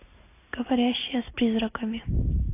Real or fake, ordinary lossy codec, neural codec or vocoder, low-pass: real; AAC, 24 kbps; none; 3.6 kHz